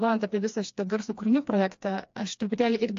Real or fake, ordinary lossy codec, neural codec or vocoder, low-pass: fake; AAC, 48 kbps; codec, 16 kHz, 2 kbps, FreqCodec, smaller model; 7.2 kHz